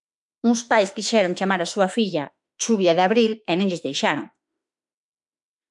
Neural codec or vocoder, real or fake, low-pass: autoencoder, 48 kHz, 32 numbers a frame, DAC-VAE, trained on Japanese speech; fake; 10.8 kHz